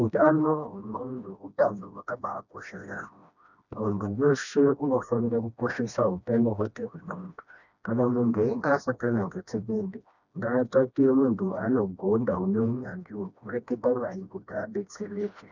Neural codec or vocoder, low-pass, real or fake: codec, 16 kHz, 1 kbps, FreqCodec, smaller model; 7.2 kHz; fake